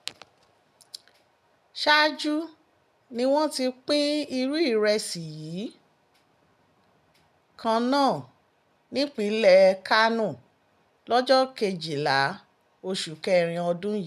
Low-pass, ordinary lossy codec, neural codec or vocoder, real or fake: 14.4 kHz; none; none; real